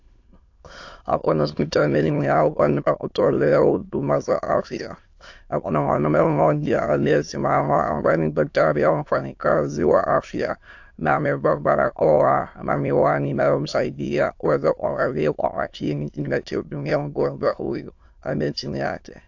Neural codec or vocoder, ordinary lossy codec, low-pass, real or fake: autoencoder, 22.05 kHz, a latent of 192 numbers a frame, VITS, trained on many speakers; AAC, 48 kbps; 7.2 kHz; fake